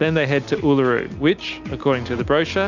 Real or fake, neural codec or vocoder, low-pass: real; none; 7.2 kHz